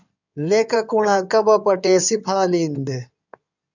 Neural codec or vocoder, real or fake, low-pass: codec, 16 kHz in and 24 kHz out, 2.2 kbps, FireRedTTS-2 codec; fake; 7.2 kHz